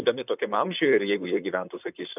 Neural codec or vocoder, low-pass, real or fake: vocoder, 44.1 kHz, 128 mel bands, Pupu-Vocoder; 3.6 kHz; fake